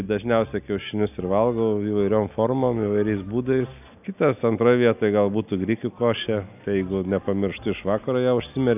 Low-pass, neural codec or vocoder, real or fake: 3.6 kHz; none; real